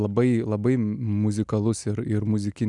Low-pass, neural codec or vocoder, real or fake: 10.8 kHz; none; real